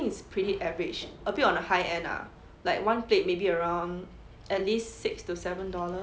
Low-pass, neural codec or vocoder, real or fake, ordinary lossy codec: none; none; real; none